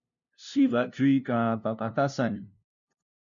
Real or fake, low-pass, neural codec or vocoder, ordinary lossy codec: fake; 7.2 kHz; codec, 16 kHz, 0.5 kbps, FunCodec, trained on LibriTTS, 25 frames a second; MP3, 96 kbps